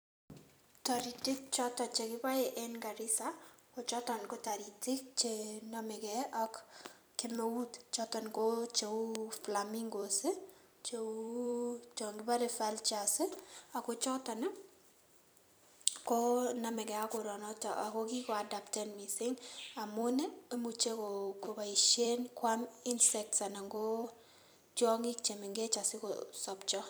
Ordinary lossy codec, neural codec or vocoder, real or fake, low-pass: none; vocoder, 44.1 kHz, 128 mel bands every 256 samples, BigVGAN v2; fake; none